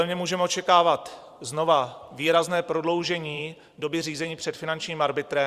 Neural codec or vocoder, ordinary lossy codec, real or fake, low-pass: vocoder, 44.1 kHz, 128 mel bands every 512 samples, BigVGAN v2; Opus, 64 kbps; fake; 14.4 kHz